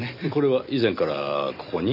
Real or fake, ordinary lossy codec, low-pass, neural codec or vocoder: real; none; 5.4 kHz; none